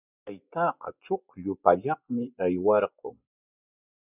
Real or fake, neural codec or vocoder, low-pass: fake; vocoder, 24 kHz, 100 mel bands, Vocos; 3.6 kHz